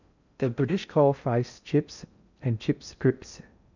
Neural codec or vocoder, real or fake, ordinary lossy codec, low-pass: codec, 16 kHz in and 24 kHz out, 0.6 kbps, FocalCodec, streaming, 2048 codes; fake; none; 7.2 kHz